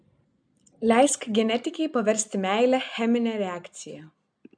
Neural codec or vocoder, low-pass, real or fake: none; 9.9 kHz; real